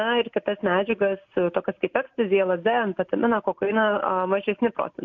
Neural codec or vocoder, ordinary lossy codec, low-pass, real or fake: none; MP3, 64 kbps; 7.2 kHz; real